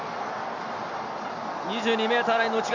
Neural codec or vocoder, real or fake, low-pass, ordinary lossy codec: none; real; 7.2 kHz; none